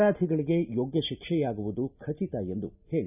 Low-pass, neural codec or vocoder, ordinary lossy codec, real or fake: 3.6 kHz; none; none; real